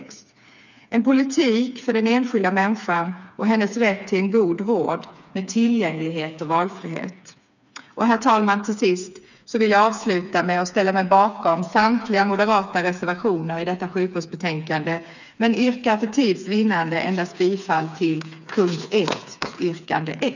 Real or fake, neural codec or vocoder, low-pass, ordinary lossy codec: fake; codec, 16 kHz, 4 kbps, FreqCodec, smaller model; 7.2 kHz; none